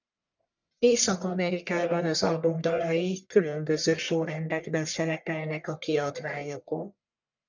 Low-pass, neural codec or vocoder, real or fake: 7.2 kHz; codec, 44.1 kHz, 1.7 kbps, Pupu-Codec; fake